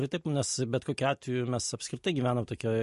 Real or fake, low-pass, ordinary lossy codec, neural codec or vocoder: real; 14.4 kHz; MP3, 48 kbps; none